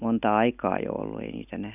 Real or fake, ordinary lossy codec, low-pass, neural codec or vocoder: real; none; 3.6 kHz; none